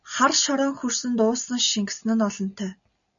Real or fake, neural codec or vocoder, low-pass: real; none; 7.2 kHz